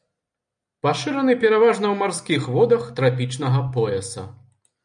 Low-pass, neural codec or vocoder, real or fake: 9.9 kHz; none; real